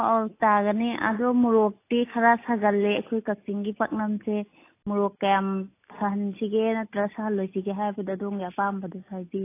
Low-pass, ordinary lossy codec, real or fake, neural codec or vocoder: 3.6 kHz; AAC, 24 kbps; real; none